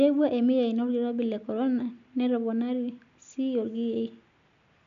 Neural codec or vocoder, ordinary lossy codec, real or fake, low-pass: none; none; real; 7.2 kHz